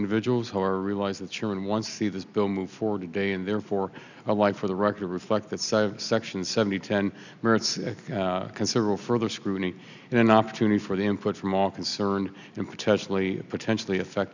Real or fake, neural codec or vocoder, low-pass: real; none; 7.2 kHz